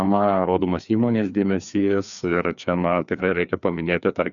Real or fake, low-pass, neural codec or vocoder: fake; 7.2 kHz; codec, 16 kHz, 2 kbps, FreqCodec, larger model